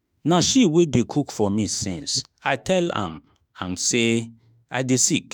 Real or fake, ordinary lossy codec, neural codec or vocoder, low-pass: fake; none; autoencoder, 48 kHz, 32 numbers a frame, DAC-VAE, trained on Japanese speech; none